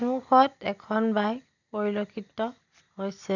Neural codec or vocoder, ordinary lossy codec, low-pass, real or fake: none; none; 7.2 kHz; real